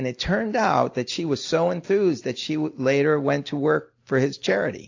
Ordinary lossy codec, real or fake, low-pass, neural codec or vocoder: AAC, 48 kbps; real; 7.2 kHz; none